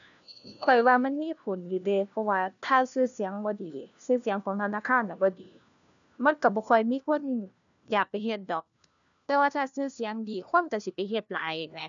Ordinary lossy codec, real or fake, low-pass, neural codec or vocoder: none; fake; 7.2 kHz; codec, 16 kHz, 1 kbps, FunCodec, trained on LibriTTS, 50 frames a second